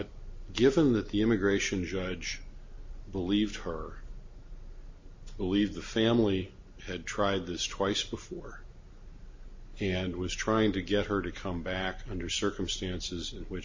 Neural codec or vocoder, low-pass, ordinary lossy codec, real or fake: none; 7.2 kHz; MP3, 32 kbps; real